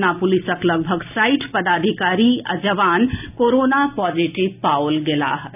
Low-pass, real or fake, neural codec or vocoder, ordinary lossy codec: 3.6 kHz; real; none; none